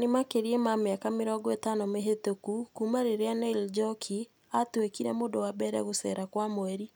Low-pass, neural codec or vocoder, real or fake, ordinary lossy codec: none; none; real; none